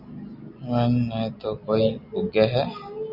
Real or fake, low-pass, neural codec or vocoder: real; 5.4 kHz; none